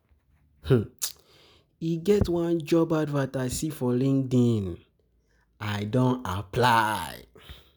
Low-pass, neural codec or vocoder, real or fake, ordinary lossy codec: none; none; real; none